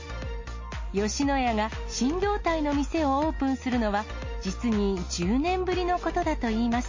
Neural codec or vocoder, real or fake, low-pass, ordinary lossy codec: none; real; 7.2 kHz; MP3, 32 kbps